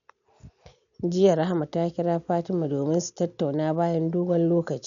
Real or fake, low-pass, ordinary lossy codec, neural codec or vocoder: real; 7.2 kHz; MP3, 96 kbps; none